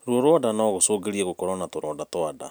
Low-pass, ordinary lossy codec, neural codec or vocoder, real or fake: none; none; none; real